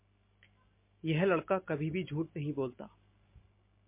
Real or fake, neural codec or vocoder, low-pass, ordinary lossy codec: real; none; 3.6 kHz; MP3, 24 kbps